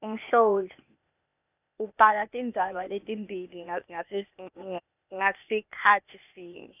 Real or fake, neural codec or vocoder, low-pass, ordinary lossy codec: fake; codec, 16 kHz, 0.8 kbps, ZipCodec; 3.6 kHz; none